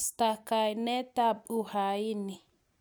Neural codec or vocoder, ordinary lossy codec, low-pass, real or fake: none; none; none; real